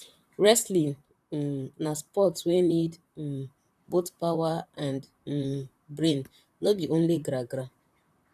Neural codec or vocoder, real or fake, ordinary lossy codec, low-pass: vocoder, 44.1 kHz, 128 mel bands, Pupu-Vocoder; fake; none; 14.4 kHz